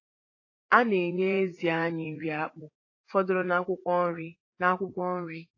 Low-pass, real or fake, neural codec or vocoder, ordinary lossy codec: 7.2 kHz; fake; vocoder, 44.1 kHz, 80 mel bands, Vocos; AAC, 32 kbps